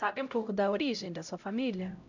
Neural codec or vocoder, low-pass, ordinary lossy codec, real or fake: codec, 16 kHz, 0.5 kbps, X-Codec, HuBERT features, trained on LibriSpeech; 7.2 kHz; none; fake